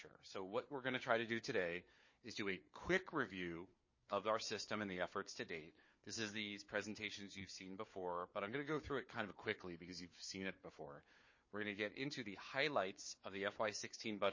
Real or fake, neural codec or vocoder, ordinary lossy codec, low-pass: fake; codec, 16 kHz, 4 kbps, FunCodec, trained on Chinese and English, 50 frames a second; MP3, 32 kbps; 7.2 kHz